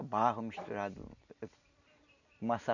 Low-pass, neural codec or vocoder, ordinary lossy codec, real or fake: 7.2 kHz; none; none; real